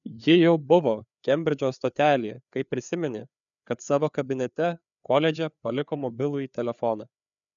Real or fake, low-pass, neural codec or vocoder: fake; 7.2 kHz; codec, 16 kHz, 4 kbps, FreqCodec, larger model